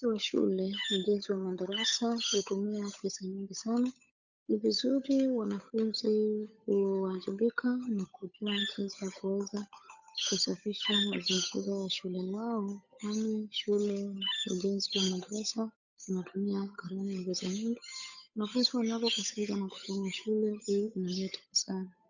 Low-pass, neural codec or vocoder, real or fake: 7.2 kHz; codec, 16 kHz, 8 kbps, FunCodec, trained on Chinese and English, 25 frames a second; fake